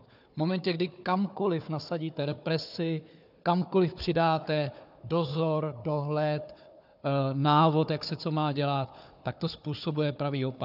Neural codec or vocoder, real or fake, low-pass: codec, 16 kHz, 4 kbps, FunCodec, trained on Chinese and English, 50 frames a second; fake; 5.4 kHz